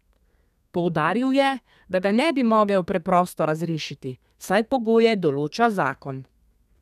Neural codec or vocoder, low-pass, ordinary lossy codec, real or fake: codec, 32 kHz, 1.9 kbps, SNAC; 14.4 kHz; none; fake